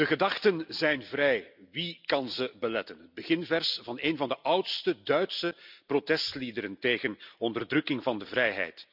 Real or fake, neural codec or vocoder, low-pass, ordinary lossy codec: real; none; 5.4 kHz; none